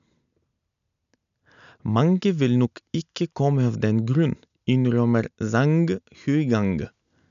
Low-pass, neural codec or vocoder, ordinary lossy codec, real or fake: 7.2 kHz; none; none; real